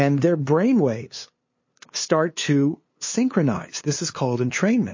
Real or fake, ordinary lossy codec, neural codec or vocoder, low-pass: fake; MP3, 32 kbps; codec, 16 kHz, 2 kbps, FunCodec, trained on LibriTTS, 25 frames a second; 7.2 kHz